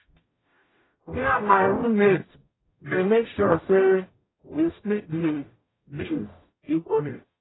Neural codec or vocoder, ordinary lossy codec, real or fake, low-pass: codec, 44.1 kHz, 0.9 kbps, DAC; AAC, 16 kbps; fake; 7.2 kHz